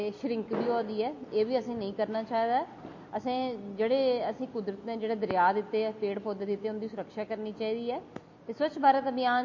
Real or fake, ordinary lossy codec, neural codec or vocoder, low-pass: real; MP3, 32 kbps; none; 7.2 kHz